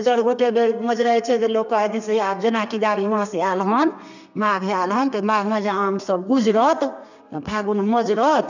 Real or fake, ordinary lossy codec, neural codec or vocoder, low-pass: fake; none; codec, 32 kHz, 1.9 kbps, SNAC; 7.2 kHz